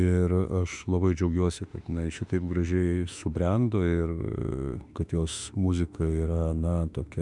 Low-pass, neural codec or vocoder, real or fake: 10.8 kHz; autoencoder, 48 kHz, 32 numbers a frame, DAC-VAE, trained on Japanese speech; fake